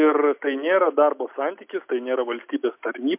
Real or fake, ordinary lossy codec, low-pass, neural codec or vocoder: real; MP3, 32 kbps; 3.6 kHz; none